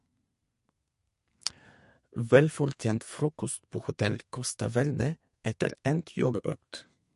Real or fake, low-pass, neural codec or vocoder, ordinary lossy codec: fake; 14.4 kHz; codec, 32 kHz, 1.9 kbps, SNAC; MP3, 48 kbps